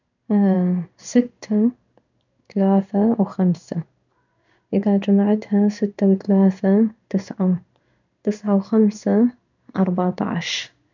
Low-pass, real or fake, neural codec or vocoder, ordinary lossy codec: 7.2 kHz; fake; codec, 16 kHz in and 24 kHz out, 1 kbps, XY-Tokenizer; none